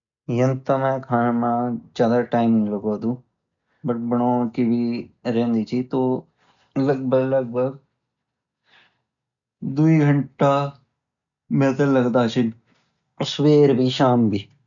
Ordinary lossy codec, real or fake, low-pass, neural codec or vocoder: none; real; 7.2 kHz; none